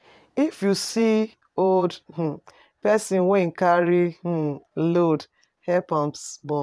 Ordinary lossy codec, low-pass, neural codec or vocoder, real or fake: none; none; vocoder, 22.05 kHz, 80 mel bands, Vocos; fake